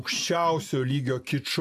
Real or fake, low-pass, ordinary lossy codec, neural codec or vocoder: real; 14.4 kHz; AAC, 96 kbps; none